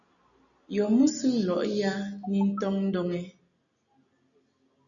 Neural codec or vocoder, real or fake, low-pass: none; real; 7.2 kHz